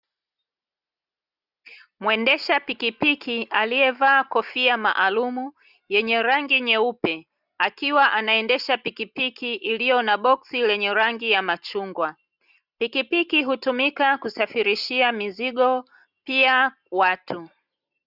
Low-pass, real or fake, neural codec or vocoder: 5.4 kHz; real; none